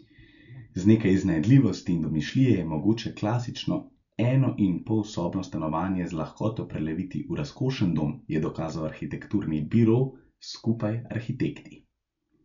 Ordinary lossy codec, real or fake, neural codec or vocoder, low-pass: MP3, 96 kbps; real; none; 7.2 kHz